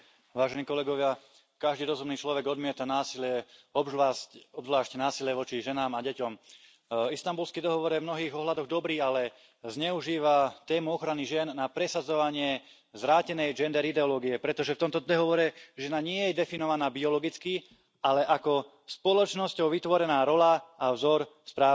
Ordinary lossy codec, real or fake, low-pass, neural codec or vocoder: none; real; none; none